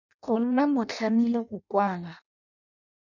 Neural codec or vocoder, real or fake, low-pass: codec, 16 kHz in and 24 kHz out, 0.6 kbps, FireRedTTS-2 codec; fake; 7.2 kHz